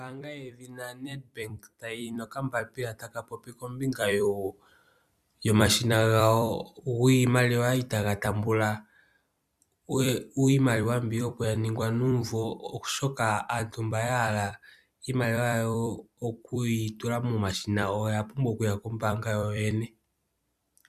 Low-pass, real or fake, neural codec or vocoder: 14.4 kHz; fake; vocoder, 44.1 kHz, 128 mel bands every 256 samples, BigVGAN v2